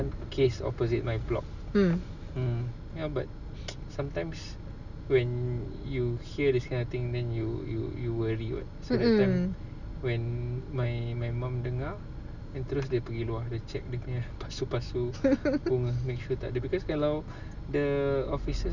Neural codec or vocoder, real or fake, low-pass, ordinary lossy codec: none; real; 7.2 kHz; none